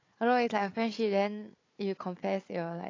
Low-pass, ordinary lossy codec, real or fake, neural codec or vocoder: 7.2 kHz; AAC, 32 kbps; fake; codec, 16 kHz, 4 kbps, FunCodec, trained on Chinese and English, 50 frames a second